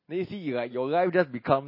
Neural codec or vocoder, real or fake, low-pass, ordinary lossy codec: none; real; 5.4 kHz; MP3, 24 kbps